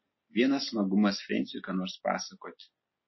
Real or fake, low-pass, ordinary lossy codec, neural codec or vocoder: real; 7.2 kHz; MP3, 24 kbps; none